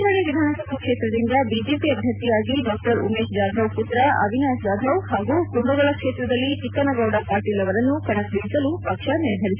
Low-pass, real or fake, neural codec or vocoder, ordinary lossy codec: 3.6 kHz; real; none; Opus, 64 kbps